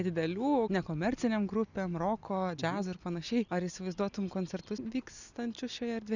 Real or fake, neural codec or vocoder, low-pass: real; none; 7.2 kHz